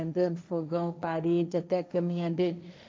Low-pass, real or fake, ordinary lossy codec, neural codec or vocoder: none; fake; none; codec, 16 kHz, 1.1 kbps, Voila-Tokenizer